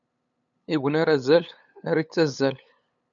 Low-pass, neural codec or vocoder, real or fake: 7.2 kHz; codec, 16 kHz, 8 kbps, FunCodec, trained on LibriTTS, 25 frames a second; fake